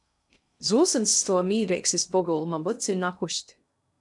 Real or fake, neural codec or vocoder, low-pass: fake; codec, 16 kHz in and 24 kHz out, 0.6 kbps, FocalCodec, streaming, 2048 codes; 10.8 kHz